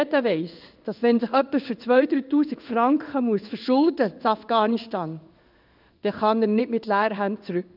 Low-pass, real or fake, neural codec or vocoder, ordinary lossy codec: 5.4 kHz; fake; codec, 16 kHz in and 24 kHz out, 1 kbps, XY-Tokenizer; none